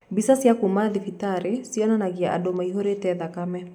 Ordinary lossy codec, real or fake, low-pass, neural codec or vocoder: none; real; 19.8 kHz; none